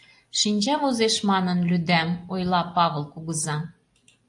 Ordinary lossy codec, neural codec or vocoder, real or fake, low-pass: AAC, 64 kbps; none; real; 10.8 kHz